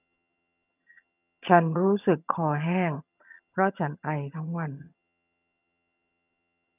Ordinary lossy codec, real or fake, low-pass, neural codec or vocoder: none; fake; 3.6 kHz; vocoder, 22.05 kHz, 80 mel bands, HiFi-GAN